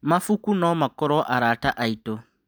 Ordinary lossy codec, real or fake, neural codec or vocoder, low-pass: none; real; none; none